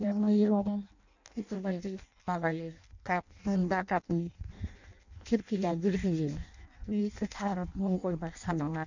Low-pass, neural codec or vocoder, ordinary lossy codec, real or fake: 7.2 kHz; codec, 16 kHz in and 24 kHz out, 0.6 kbps, FireRedTTS-2 codec; none; fake